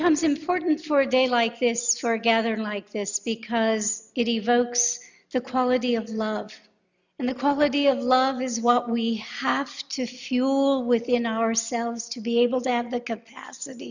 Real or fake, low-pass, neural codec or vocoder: real; 7.2 kHz; none